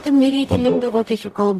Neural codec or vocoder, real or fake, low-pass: codec, 44.1 kHz, 0.9 kbps, DAC; fake; 14.4 kHz